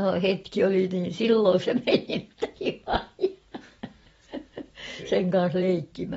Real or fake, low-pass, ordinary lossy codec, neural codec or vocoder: fake; 19.8 kHz; AAC, 24 kbps; vocoder, 44.1 kHz, 128 mel bands every 512 samples, BigVGAN v2